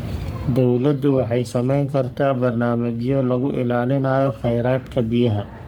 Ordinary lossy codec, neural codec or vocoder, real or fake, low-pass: none; codec, 44.1 kHz, 3.4 kbps, Pupu-Codec; fake; none